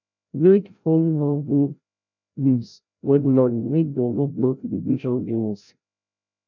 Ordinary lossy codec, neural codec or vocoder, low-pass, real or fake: none; codec, 16 kHz, 0.5 kbps, FreqCodec, larger model; 7.2 kHz; fake